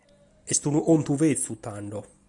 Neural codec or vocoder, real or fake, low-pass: none; real; 10.8 kHz